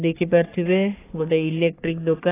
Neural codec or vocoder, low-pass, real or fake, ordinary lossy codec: codec, 44.1 kHz, 3.4 kbps, Pupu-Codec; 3.6 kHz; fake; AAC, 16 kbps